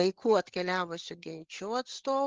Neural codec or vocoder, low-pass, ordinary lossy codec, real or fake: codec, 16 kHz, 8 kbps, FreqCodec, larger model; 7.2 kHz; Opus, 16 kbps; fake